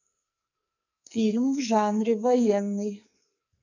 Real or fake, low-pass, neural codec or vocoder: fake; 7.2 kHz; codec, 44.1 kHz, 2.6 kbps, SNAC